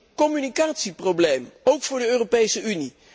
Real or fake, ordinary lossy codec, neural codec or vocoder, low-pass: real; none; none; none